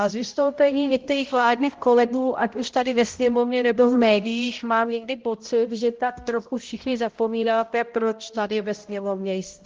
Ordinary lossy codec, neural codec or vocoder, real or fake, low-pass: Opus, 16 kbps; codec, 16 kHz, 0.5 kbps, X-Codec, HuBERT features, trained on balanced general audio; fake; 7.2 kHz